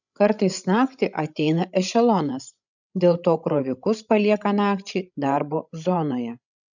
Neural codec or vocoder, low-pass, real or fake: codec, 16 kHz, 16 kbps, FreqCodec, larger model; 7.2 kHz; fake